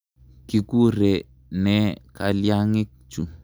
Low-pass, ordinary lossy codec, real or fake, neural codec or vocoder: none; none; real; none